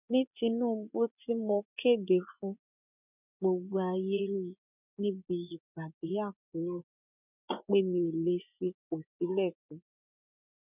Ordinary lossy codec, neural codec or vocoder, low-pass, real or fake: none; vocoder, 24 kHz, 100 mel bands, Vocos; 3.6 kHz; fake